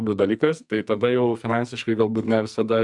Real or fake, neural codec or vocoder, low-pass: fake; codec, 44.1 kHz, 2.6 kbps, SNAC; 10.8 kHz